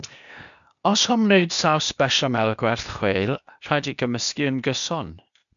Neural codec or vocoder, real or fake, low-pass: codec, 16 kHz, 0.8 kbps, ZipCodec; fake; 7.2 kHz